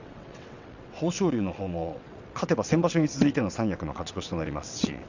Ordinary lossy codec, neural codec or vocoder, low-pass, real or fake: none; vocoder, 22.05 kHz, 80 mel bands, WaveNeXt; 7.2 kHz; fake